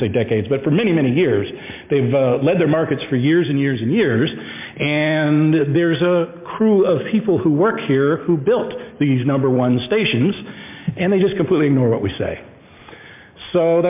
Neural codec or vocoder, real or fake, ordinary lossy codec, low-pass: none; real; MP3, 32 kbps; 3.6 kHz